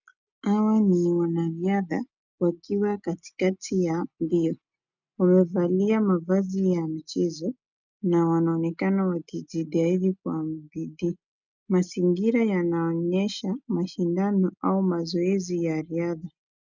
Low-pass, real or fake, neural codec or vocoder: 7.2 kHz; real; none